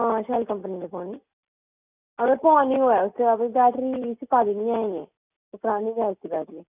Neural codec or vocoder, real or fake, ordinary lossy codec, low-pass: none; real; none; 3.6 kHz